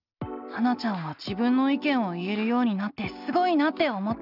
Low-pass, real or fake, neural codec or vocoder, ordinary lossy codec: 5.4 kHz; real; none; none